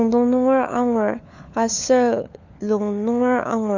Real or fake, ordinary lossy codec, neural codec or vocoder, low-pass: fake; none; codec, 16 kHz, 4 kbps, FunCodec, trained on LibriTTS, 50 frames a second; 7.2 kHz